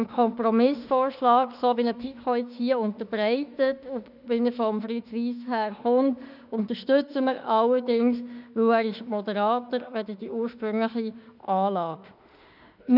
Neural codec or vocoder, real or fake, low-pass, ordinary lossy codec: autoencoder, 48 kHz, 32 numbers a frame, DAC-VAE, trained on Japanese speech; fake; 5.4 kHz; none